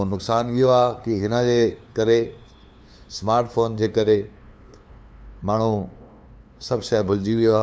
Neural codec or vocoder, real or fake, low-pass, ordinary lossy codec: codec, 16 kHz, 2 kbps, FunCodec, trained on LibriTTS, 25 frames a second; fake; none; none